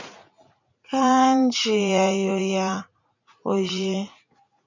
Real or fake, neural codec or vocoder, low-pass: fake; vocoder, 44.1 kHz, 80 mel bands, Vocos; 7.2 kHz